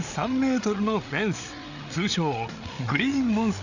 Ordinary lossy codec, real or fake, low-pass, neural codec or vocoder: none; fake; 7.2 kHz; codec, 16 kHz, 8 kbps, FreqCodec, larger model